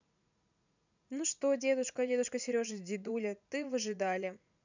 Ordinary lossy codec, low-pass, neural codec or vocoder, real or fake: none; 7.2 kHz; vocoder, 44.1 kHz, 80 mel bands, Vocos; fake